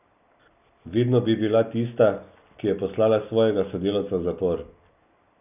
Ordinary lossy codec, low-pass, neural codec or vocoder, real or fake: none; 3.6 kHz; none; real